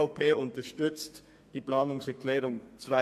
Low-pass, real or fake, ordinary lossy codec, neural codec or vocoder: 14.4 kHz; fake; MP3, 64 kbps; codec, 32 kHz, 1.9 kbps, SNAC